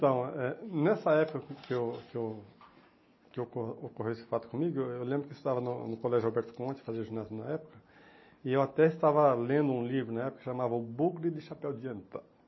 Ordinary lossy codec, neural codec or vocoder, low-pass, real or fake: MP3, 24 kbps; none; 7.2 kHz; real